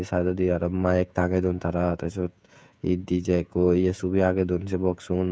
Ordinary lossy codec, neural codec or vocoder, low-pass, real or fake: none; codec, 16 kHz, 16 kbps, FreqCodec, smaller model; none; fake